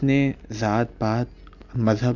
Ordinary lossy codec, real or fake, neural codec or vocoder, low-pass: none; real; none; 7.2 kHz